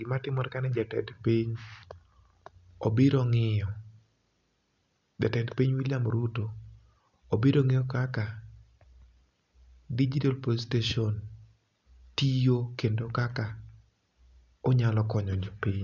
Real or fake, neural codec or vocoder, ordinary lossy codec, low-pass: real; none; none; 7.2 kHz